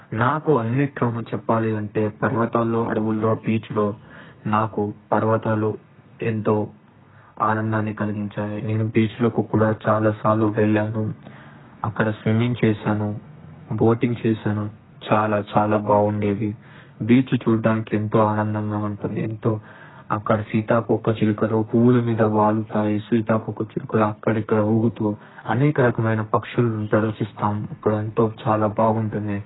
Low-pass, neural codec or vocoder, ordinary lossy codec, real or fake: 7.2 kHz; codec, 32 kHz, 1.9 kbps, SNAC; AAC, 16 kbps; fake